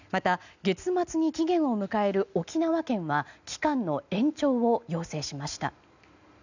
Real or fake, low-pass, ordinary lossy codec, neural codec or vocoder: real; 7.2 kHz; none; none